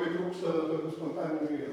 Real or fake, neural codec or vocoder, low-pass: fake; vocoder, 44.1 kHz, 128 mel bands, Pupu-Vocoder; 19.8 kHz